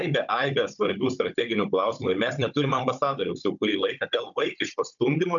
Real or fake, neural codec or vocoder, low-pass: fake; codec, 16 kHz, 16 kbps, FunCodec, trained on Chinese and English, 50 frames a second; 7.2 kHz